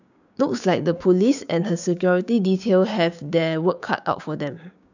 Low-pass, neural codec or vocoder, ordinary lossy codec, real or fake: 7.2 kHz; codec, 16 kHz, 6 kbps, DAC; none; fake